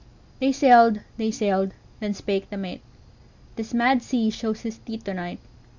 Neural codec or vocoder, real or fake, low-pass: none; real; 7.2 kHz